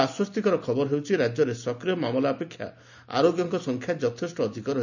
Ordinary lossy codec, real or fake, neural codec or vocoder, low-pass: none; real; none; 7.2 kHz